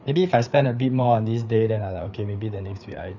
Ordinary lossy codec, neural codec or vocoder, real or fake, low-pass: none; codec, 16 kHz, 4 kbps, FreqCodec, larger model; fake; 7.2 kHz